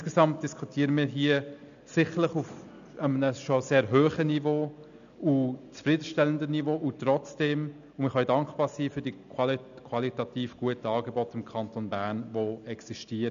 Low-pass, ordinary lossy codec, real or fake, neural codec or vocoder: 7.2 kHz; none; real; none